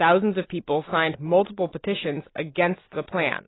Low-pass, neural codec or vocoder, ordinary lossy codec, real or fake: 7.2 kHz; none; AAC, 16 kbps; real